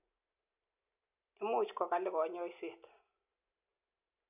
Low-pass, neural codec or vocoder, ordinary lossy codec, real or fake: 3.6 kHz; none; none; real